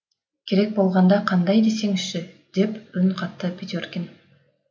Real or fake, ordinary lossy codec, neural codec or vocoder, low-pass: real; none; none; none